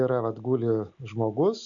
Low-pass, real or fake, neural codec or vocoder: 7.2 kHz; real; none